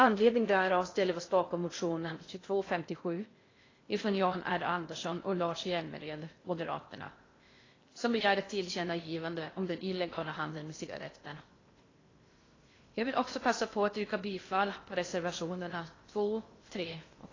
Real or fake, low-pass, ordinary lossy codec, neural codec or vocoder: fake; 7.2 kHz; AAC, 32 kbps; codec, 16 kHz in and 24 kHz out, 0.6 kbps, FocalCodec, streaming, 2048 codes